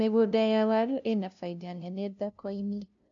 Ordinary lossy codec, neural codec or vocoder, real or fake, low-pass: none; codec, 16 kHz, 0.5 kbps, FunCodec, trained on LibriTTS, 25 frames a second; fake; 7.2 kHz